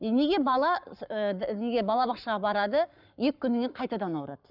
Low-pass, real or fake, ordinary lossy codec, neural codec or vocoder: 5.4 kHz; fake; none; codec, 44.1 kHz, 7.8 kbps, Pupu-Codec